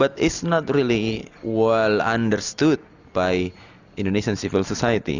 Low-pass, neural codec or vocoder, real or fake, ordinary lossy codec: 7.2 kHz; none; real; Opus, 64 kbps